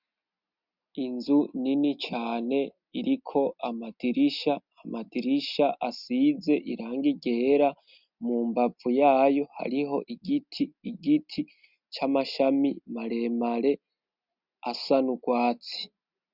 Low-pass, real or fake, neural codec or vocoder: 5.4 kHz; real; none